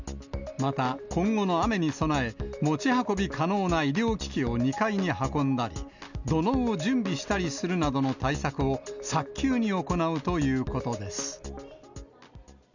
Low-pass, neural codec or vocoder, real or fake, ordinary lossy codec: 7.2 kHz; none; real; none